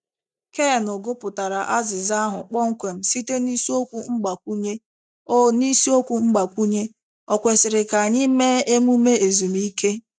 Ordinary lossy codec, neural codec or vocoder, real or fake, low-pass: none; none; real; 9.9 kHz